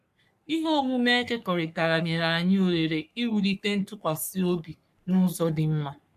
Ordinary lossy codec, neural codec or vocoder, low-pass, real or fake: none; codec, 44.1 kHz, 3.4 kbps, Pupu-Codec; 14.4 kHz; fake